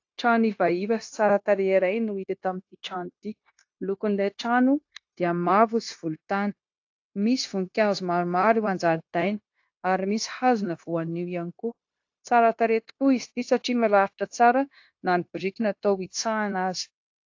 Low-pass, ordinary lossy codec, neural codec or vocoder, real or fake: 7.2 kHz; AAC, 48 kbps; codec, 16 kHz, 0.9 kbps, LongCat-Audio-Codec; fake